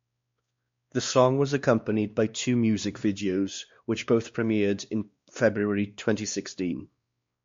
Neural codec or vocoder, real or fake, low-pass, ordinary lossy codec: codec, 16 kHz, 2 kbps, X-Codec, WavLM features, trained on Multilingual LibriSpeech; fake; 7.2 kHz; MP3, 48 kbps